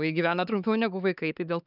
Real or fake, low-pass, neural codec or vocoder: fake; 5.4 kHz; codec, 16 kHz, 4 kbps, X-Codec, HuBERT features, trained on LibriSpeech